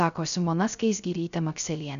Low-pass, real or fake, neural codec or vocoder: 7.2 kHz; fake; codec, 16 kHz, 0.3 kbps, FocalCodec